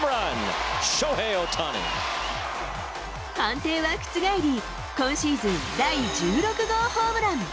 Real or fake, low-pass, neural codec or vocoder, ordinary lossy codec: real; none; none; none